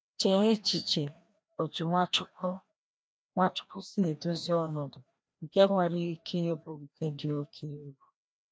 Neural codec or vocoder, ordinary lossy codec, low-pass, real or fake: codec, 16 kHz, 1 kbps, FreqCodec, larger model; none; none; fake